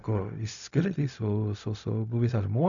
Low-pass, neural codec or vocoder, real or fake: 7.2 kHz; codec, 16 kHz, 0.4 kbps, LongCat-Audio-Codec; fake